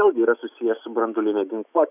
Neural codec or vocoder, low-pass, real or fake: autoencoder, 48 kHz, 128 numbers a frame, DAC-VAE, trained on Japanese speech; 3.6 kHz; fake